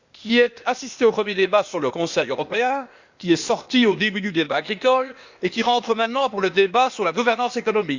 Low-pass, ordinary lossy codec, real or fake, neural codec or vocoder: 7.2 kHz; Opus, 64 kbps; fake; codec, 16 kHz, 0.8 kbps, ZipCodec